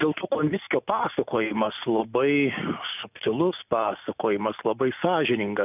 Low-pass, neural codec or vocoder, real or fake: 3.6 kHz; none; real